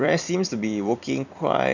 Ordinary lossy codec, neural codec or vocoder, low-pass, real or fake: none; vocoder, 44.1 kHz, 128 mel bands every 256 samples, BigVGAN v2; 7.2 kHz; fake